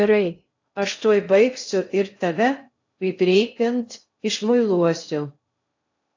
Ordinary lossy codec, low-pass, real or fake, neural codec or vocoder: AAC, 48 kbps; 7.2 kHz; fake; codec, 16 kHz in and 24 kHz out, 0.6 kbps, FocalCodec, streaming, 2048 codes